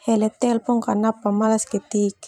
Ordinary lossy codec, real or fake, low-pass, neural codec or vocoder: none; fake; 19.8 kHz; vocoder, 44.1 kHz, 128 mel bands every 512 samples, BigVGAN v2